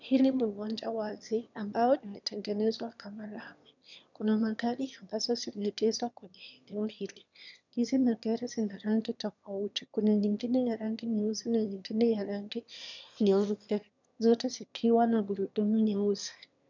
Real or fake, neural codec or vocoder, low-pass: fake; autoencoder, 22.05 kHz, a latent of 192 numbers a frame, VITS, trained on one speaker; 7.2 kHz